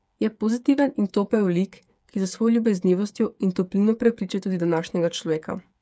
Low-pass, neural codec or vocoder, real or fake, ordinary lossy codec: none; codec, 16 kHz, 8 kbps, FreqCodec, smaller model; fake; none